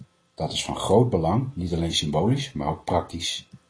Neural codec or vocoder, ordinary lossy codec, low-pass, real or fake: none; AAC, 32 kbps; 9.9 kHz; real